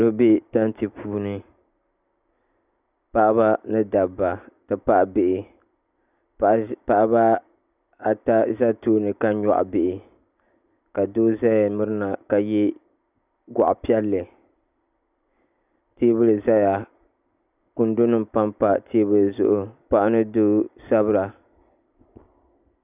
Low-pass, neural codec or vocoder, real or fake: 3.6 kHz; none; real